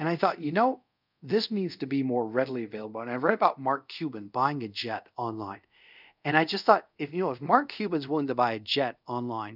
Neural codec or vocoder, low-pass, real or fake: codec, 24 kHz, 0.5 kbps, DualCodec; 5.4 kHz; fake